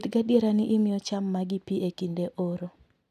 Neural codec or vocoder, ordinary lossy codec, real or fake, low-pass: none; none; real; 14.4 kHz